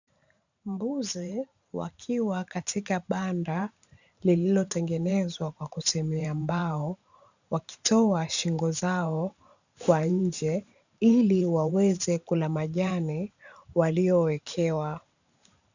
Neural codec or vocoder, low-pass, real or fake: vocoder, 44.1 kHz, 128 mel bands every 512 samples, BigVGAN v2; 7.2 kHz; fake